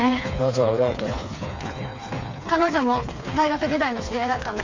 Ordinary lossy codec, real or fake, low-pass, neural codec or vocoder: AAC, 48 kbps; fake; 7.2 kHz; codec, 16 kHz, 4 kbps, FreqCodec, smaller model